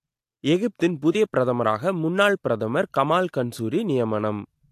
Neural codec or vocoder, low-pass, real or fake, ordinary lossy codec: none; 14.4 kHz; real; AAC, 64 kbps